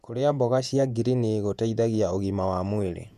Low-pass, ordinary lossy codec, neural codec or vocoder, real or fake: 14.4 kHz; none; vocoder, 48 kHz, 128 mel bands, Vocos; fake